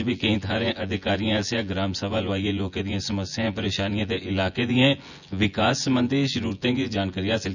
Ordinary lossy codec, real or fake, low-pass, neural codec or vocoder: none; fake; 7.2 kHz; vocoder, 24 kHz, 100 mel bands, Vocos